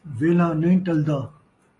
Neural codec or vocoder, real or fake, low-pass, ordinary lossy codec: none; real; 10.8 kHz; AAC, 32 kbps